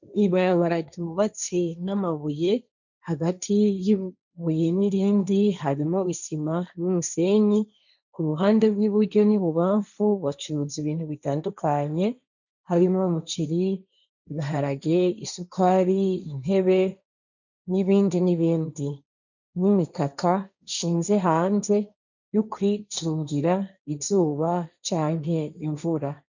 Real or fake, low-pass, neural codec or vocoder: fake; 7.2 kHz; codec, 16 kHz, 1.1 kbps, Voila-Tokenizer